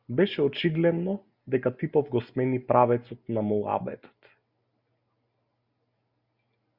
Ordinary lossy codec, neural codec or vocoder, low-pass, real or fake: Opus, 64 kbps; none; 5.4 kHz; real